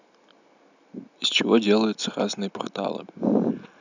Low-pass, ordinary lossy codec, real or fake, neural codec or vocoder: 7.2 kHz; none; real; none